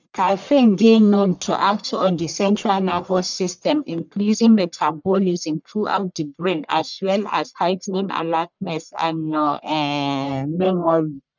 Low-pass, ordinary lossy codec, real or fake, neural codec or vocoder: 7.2 kHz; none; fake; codec, 44.1 kHz, 1.7 kbps, Pupu-Codec